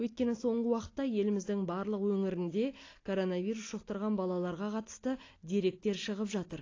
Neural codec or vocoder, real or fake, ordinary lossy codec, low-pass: none; real; AAC, 32 kbps; 7.2 kHz